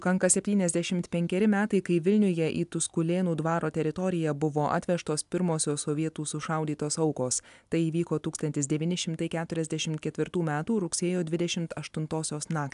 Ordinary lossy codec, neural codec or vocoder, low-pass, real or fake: AAC, 96 kbps; none; 10.8 kHz; real